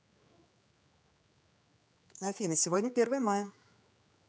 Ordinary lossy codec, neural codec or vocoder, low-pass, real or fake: none; codec, 16 kHz, 4 kbps, X-Codec, HuBERT features, trained on general audio; none; fake